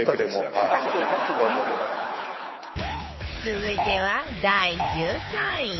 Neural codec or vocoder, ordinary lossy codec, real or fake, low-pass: codec, 24 kHz, 6 kbps, HILCodec; MP3, 24 kbps; fake; 7.2 kHz